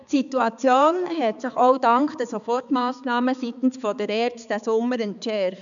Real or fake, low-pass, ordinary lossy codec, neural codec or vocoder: fake; 7.2 kHz; none; codec, 16 kHz, 4 kbps, X-Codec, HuBERT features, trained on balanced general audio